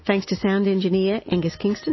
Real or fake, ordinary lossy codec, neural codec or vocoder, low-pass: real; MP3, 24 kbps; none; 7.2 kHz